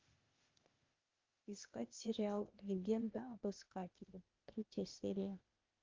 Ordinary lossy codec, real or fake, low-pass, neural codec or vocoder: Opus, 32 kbps; fake; 7.2 kHz; codec, 16 kHz, 0.8 kbps, ZipCodec